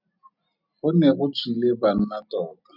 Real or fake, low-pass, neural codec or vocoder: real; 5.4 kHz; none